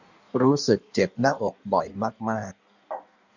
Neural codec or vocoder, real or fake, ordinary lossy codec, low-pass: codec, 16 kHz in and 24 kHz out, 1.1 kbps, FireRedTTS-2 codec; fake; none; 7.2 kHz